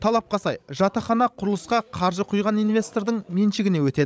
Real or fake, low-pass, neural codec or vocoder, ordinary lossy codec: fake; none; codec, 16 kHz, 16 kbps, FreqCodec, larger model; none